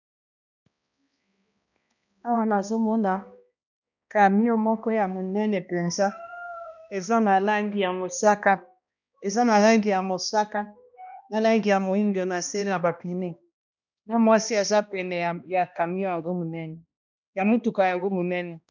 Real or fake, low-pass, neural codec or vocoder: fake; 7.2 kHz; codec, 16 kHz, 1 kbps, X-Codec, HuBERT features, trained on balanced general audio